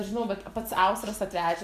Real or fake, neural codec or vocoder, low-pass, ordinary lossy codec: fake; vocoder, 44.1 kHz, 128 mel bands every 256 samples, BigVGAN v2; 14.4 kHz; Opus, 64 kbps